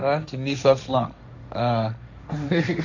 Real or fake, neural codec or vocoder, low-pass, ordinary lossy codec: fake; codec, 16 kHz, 1.1 kbps, Voila-Tokenizer; 7.2 kHz; none